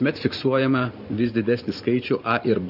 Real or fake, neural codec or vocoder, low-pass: fake; vocoder, 44.1 kHz, 128 mel bands every 512 samples, BigVGAN v2; 5.4 kHz